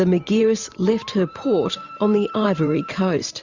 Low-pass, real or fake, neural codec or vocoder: 7.2 kHz; fake; vocoder, 44.1 kHz, 128 mel bands every 512 samples, BigVGAN v2